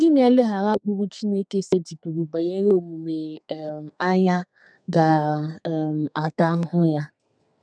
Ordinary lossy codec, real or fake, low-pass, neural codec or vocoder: none; fake; 9.9 kHz; codec, 32 kHz, 1.9 kbps, SNAC